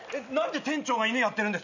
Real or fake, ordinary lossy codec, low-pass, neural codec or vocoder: real; none; 7.2 kHz; none